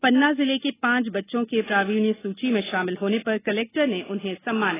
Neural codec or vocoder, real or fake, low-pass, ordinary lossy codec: none; real; 3.6 kHz; AAC, 16 kbps